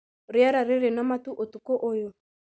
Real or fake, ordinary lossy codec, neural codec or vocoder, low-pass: real; none; none; none